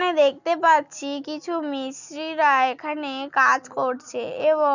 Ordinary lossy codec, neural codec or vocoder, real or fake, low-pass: none; none; real; 7.2 kHz